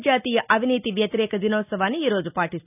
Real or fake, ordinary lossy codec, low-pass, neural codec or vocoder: real; none; 3.6 kHz; none